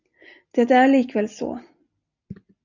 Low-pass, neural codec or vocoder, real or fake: 7.2 kHz; none; real